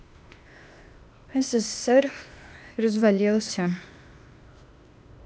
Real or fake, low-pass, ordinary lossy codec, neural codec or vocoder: fake; none; none; codec, 16 kHz, 0.8 kbps, ZipCodec